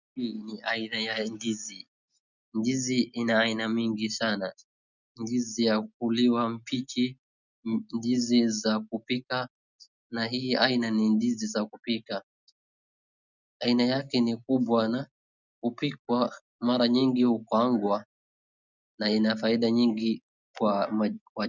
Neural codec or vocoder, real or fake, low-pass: none; real; 7.2 kHz